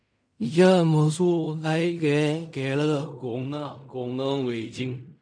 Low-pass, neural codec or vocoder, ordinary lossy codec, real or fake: 9.9 kHz; codec, 16 kHz in and 24 kHz out, 0.4 kbps, LongCat-Audio-Codec, fine tuned four codebook decoder; MP3, 64 kbps; fake